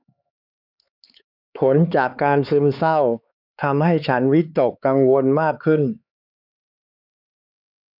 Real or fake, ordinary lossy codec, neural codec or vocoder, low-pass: fake; none; codec, 16 kHz, 4 kbps, X-Codec, HuBERT features, trained on LibriSpeech; 5.4 kHz